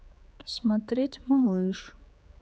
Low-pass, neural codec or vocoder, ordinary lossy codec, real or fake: none; codec, 16 kHz, 4 kbps, X-Codec, HuBERT features, trained on general audio; none; fake